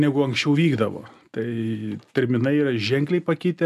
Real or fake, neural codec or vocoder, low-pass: real; none; 14.4 kHz